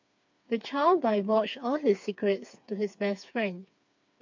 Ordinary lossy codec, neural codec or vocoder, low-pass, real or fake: MP3, 48 kbps; codec, 16 kHz, 4 kbps, FreqCodec, smaller model; 7.2 kHz; fake